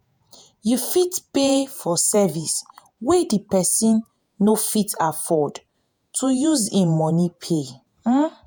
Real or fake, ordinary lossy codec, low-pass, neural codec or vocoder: fake; none; none; vocoder, 48 kHz, 128 mel bands, Vocos